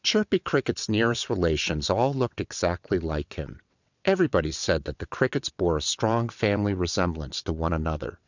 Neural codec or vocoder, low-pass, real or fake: vocoder, 22.05 kHz, 80 mel bands, WaveNeXt; 7.2 kHz; fake